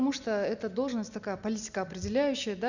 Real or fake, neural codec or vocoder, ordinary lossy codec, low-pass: real; none; none; 7.2 kHz